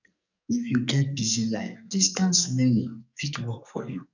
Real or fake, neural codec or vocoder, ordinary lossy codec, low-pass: fake; codec, 44.1 kHz, 2.6 kbps, SNAC; none; 7.2 kHz